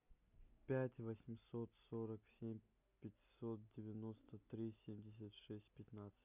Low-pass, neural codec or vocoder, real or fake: 3.6 kHz; none; real